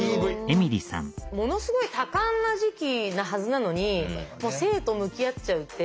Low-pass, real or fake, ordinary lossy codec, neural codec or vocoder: none; real; none; none